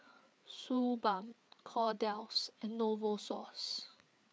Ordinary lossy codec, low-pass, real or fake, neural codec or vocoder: none; none; fake; codec, 16 kHz, 4 kbps, FreqCodec, larger model